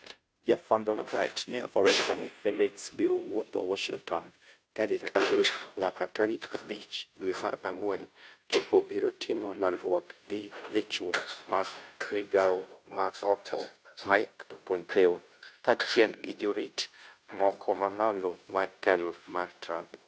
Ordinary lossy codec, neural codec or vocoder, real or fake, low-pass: none; codec, 16 kHz, 0.5 kbps, FunCodec, trained on Chinese and English, 25 frames a second; fake; none